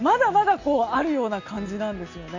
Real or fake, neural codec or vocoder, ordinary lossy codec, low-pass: real; none; AAC, 48 kbps; 7.2 kHz